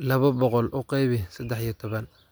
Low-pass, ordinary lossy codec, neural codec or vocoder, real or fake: none; none; none; real